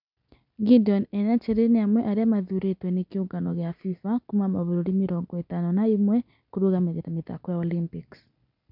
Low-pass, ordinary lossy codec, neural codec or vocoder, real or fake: 5.4 kHz; none; none; real